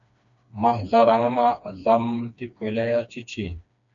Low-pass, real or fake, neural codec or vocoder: 7.2 kHz; fake; codec, 16 kHz, 2 kbps, FreqCodec, smaller model